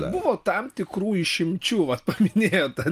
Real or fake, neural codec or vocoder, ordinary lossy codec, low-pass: real; none; Opus, 32 kbps; 14.4 kHz